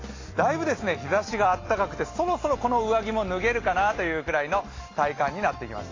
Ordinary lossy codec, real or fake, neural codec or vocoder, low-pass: AAC, 32 kbps; real; none; 7.2 kHz